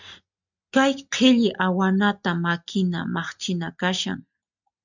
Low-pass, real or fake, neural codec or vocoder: 7.2 kHz; real; none